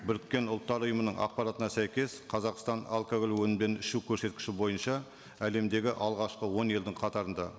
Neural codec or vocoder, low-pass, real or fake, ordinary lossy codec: none; none; real; none